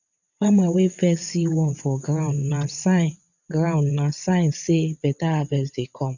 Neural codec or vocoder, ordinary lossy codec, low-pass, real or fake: vocoder, 22.05 kHz, 80 mel bands, WaveNeXt; none; 7.2 kHz; fake